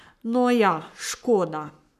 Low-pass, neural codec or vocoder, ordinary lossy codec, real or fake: 14.4 kHz; codec, 44.1 kHz, 7.8 kbps, Pupu-Codec; none; fake